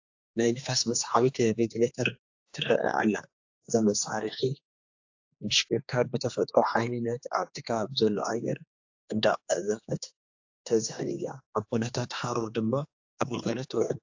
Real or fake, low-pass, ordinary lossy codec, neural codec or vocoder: fake; 7.2 kHz; AAC, 48 kbps; codec, 16 kHz, 2 kbps, X-Codec, HuBERT features, trained on general audio